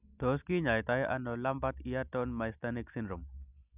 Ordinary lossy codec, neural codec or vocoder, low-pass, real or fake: none; none; 3.6 kHz; real